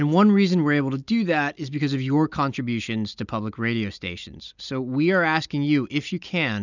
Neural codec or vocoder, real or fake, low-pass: none; real; 7.2 kHz